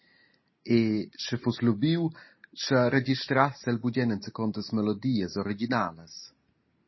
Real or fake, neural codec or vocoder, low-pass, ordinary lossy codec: real; none; 7.2 kHz; MP3, 24 kbps